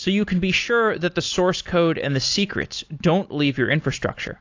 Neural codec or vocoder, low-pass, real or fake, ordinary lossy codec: none; 7.2 kHz; real; AAC, 48 kbps